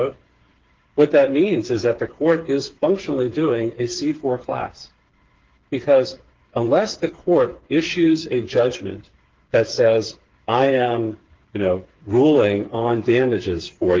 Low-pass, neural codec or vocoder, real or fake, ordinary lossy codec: 7.2 kHz; codec, 16 kHz, 4 kbps, FreqCodec, smaller model; fake; Opus, 16 kbps